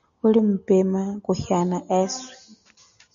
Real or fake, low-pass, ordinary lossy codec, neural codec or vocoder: real; 7.2 kHz; MP3, 96 kbps; none